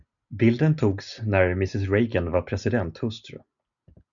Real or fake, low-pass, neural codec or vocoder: real; 7.2 kHz; none